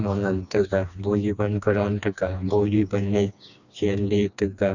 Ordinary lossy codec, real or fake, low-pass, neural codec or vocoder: none; fake; 7.2 kHz; codec, 16 kHz, 2 kbps, FreqCodec, smaller model